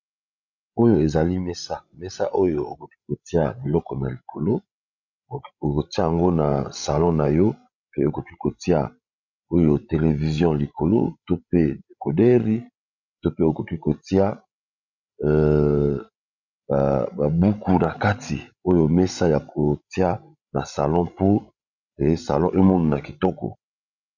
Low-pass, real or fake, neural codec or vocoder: 7.2 kHz; fake; codec, 16 kHz, 16 kbps, FreqCodec, larger model